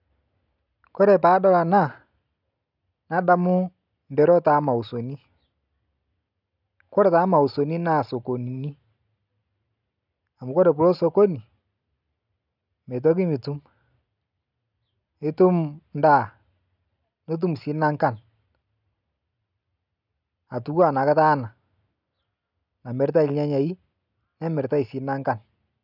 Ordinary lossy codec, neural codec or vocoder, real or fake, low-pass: none; none; real; 5.4 kHz